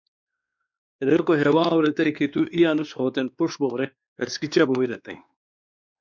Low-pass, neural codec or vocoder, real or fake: 7.2 kHz; codec, 16 kHz, 2 kbps, X-Codec, WavLM features, trained on Multilingual LibriSpeech; fake